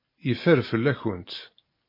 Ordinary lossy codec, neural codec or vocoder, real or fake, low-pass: MP3, 24 kbps; none; real; 5.4 kHz